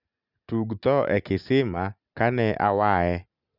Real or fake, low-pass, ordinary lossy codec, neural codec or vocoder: real; 5.4 kHz; none; none